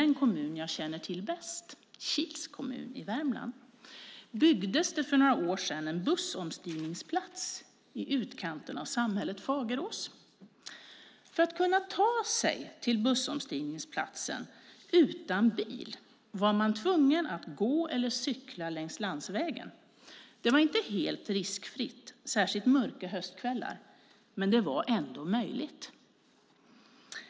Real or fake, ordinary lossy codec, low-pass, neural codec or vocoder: real; none; none; none